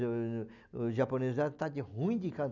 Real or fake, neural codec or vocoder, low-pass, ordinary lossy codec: real; none; 7.2 kHz; none